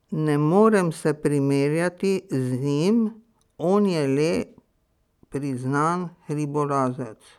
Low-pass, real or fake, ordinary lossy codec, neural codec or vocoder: 19.8 kHz; real; none; none